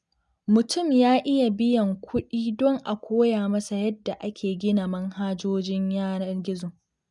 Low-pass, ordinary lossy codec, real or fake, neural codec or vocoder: 10.8 kHz; none; real; none